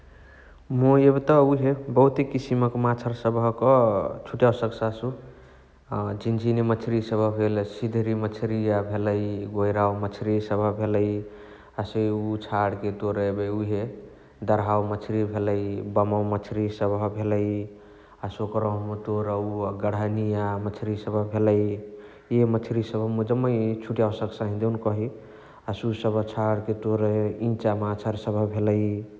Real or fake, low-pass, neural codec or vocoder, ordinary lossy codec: real; none; none; none